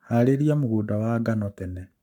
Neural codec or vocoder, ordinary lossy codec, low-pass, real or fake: codec, 44.1 kHz, 7.8 kbps, Pupu-Codec; none; 19.8 kHz; fake